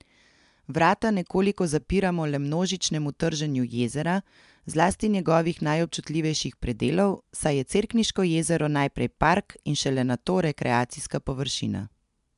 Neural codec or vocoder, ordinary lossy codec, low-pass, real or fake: none; MP3, 96 kbps; 10.8 kHz; real